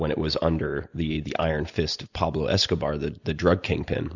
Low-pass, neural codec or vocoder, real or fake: 7.2 kHz; none; real